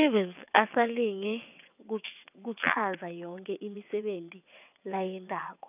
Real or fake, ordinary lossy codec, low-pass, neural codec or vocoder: fake; none; 3.6 kHz; vocoder, 22.05 kHz, 80 mel bands, WaveNeXt